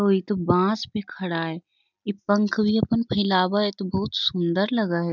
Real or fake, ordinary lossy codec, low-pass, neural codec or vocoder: real; none; 7.2 kHz; none